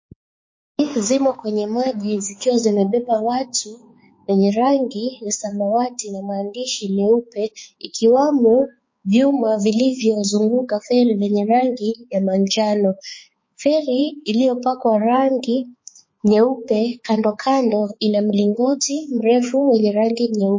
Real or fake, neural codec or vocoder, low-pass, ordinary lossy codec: fake; codec, 16 kHz, 4 kbps, X-Codec, HuBERT features, trained on balanced general audio; 7.2 kHz; MP3, 32 kbps